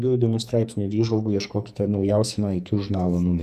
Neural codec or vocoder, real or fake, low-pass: codec, 44.1 kHz, 2.6 kbps, SNAC; fake; 14.4 kHz